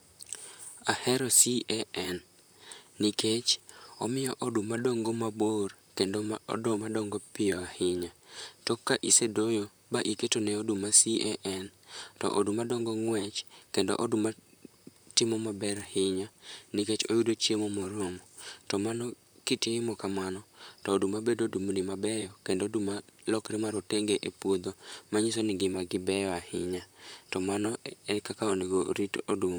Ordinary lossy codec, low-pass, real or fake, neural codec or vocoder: none; none; fake; vocoder, 44.1 kHz, 128 mel bands, Pupu-Vocoder